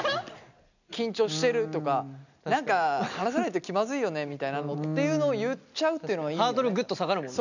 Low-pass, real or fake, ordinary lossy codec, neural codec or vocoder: 7.2 kHz; real; none; none